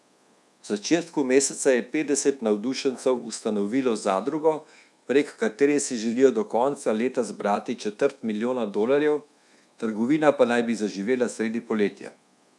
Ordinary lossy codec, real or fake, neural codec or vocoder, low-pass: none; fake; codec, 24 kHz, 1.2 kbps, DualCodec; none